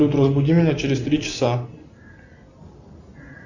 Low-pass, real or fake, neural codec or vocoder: 7.2 kHz; real; none